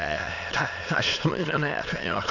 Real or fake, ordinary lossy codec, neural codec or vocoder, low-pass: fake; none; autoencoder, 22.05 kHz, a latent of 192 numbers a frame, VITS, trained on many speakers; 7.2 kHz